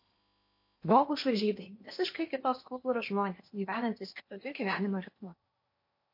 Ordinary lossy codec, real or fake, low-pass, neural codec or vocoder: MP3, 32 kbps; fake; 5.4 kHz; codec, 16 kHz in and 24 kHz out, 0.8 kbps, FocalCodec, streaming, 65536 codes